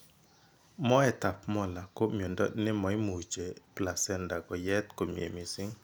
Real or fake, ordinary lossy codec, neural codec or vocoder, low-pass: real; none; none; none